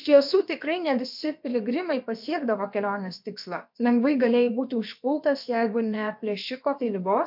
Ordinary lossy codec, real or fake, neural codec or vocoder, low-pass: MP3, 48 kbps; fake; codec, 16 kHz, about 1 kbps, DyCAST, with the encoder's durations; 5.4 kHz